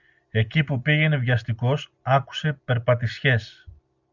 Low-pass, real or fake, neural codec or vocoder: 7.2 kHz; real; none